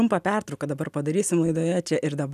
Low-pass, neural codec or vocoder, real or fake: 14.4 kHz; none; real